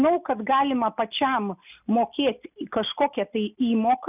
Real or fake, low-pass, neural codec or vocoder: real; 3.6 kHz; none